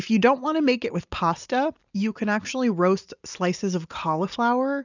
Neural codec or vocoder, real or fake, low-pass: none; real; 7.2 kHz